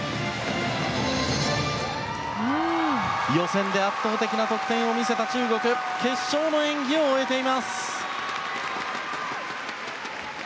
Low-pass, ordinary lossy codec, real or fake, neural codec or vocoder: none; none; real; none